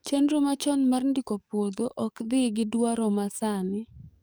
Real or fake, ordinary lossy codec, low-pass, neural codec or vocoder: fake; none; none; codec, 44.1 kHz, 7.8 kbps, DAC